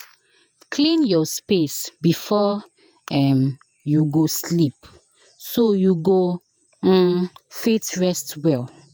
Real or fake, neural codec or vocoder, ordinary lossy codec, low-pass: fake; vocoder, 48 kHz, 128 mel bands, Vocos; none; none